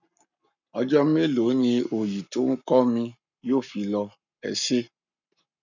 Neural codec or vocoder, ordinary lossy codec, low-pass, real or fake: codec, 44.1 kHz, 7.8 kbps, Pupu-Codec; none; 7.2 kHz; fake